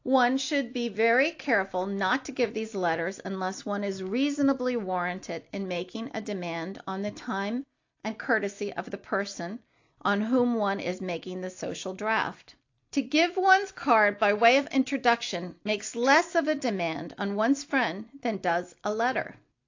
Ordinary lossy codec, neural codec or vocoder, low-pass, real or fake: AAC, 48 kbps; none; 7.2 kHz; real